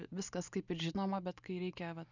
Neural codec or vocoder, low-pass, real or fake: none; 7.2 kHz; real